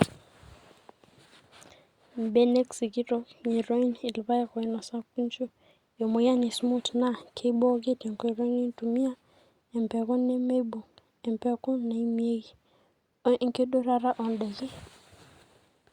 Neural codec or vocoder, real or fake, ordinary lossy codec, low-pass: none; real; Opus, 64 kbps; 19.8 kHz